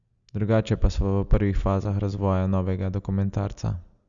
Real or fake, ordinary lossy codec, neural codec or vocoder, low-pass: real; Opus, 64 kbps; none; 7.2 kHz